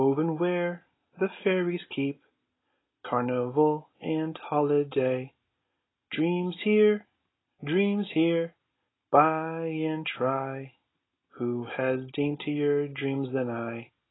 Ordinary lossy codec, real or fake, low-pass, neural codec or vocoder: AAC, 16 kbps; real; 7.2 kHz; none